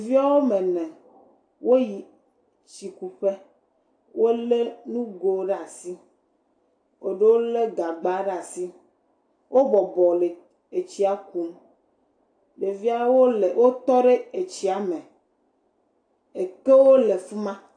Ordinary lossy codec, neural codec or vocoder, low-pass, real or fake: AAC, 48 kbps; none; 9.9 kHz; real